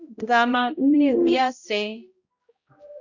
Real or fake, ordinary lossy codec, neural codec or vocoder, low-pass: fake; Opus, 64 kbps; codec, 16 kHz, 0.5 kbps, X-Codec, HuBERT features, trained on balanced general audio; 7.2 kHz